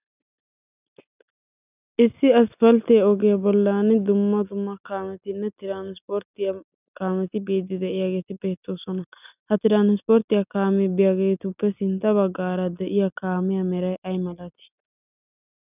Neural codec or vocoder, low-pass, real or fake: none; 3.6 kHz; real